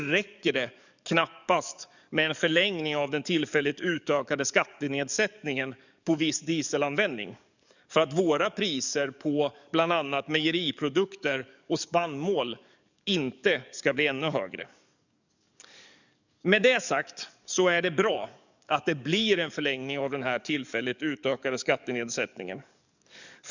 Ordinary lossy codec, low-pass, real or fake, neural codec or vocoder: none; 7.2 kHz; fake; codec, 44.1 kHz, 7.8 kbps, DAC